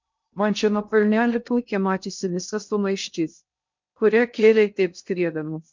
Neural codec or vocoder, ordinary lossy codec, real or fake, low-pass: codec, 16 kHz in and 24 kHz out, 0.6 kbps, FocalCodec, streaming, 2048 codes; MP3, 64 kbps; fake; 7.2 kHz